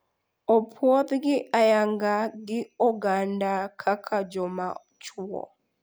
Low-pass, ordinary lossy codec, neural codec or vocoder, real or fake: none; none; none; real